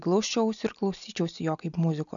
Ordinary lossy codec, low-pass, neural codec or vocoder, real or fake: MP3, 96 kbps; 7.2 kHz; none; real